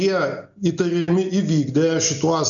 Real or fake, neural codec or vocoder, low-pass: real; none; 7.2 kHz